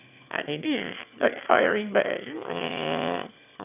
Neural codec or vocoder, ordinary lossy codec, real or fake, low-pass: autoencoder, 22.05 kHz, a latent of 192 numbers a frame, VITS, trained on one speaker; none; fake; 3.6 kHz